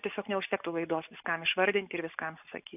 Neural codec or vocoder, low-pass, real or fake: none; 3.6 kHz; real